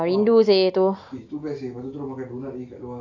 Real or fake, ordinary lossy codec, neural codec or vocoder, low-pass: real; AAC, 48 kbps; none; 7.2 kHz